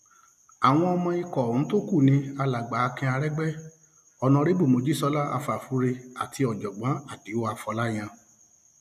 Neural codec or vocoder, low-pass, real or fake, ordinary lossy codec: none; 14.4 kHz; real; none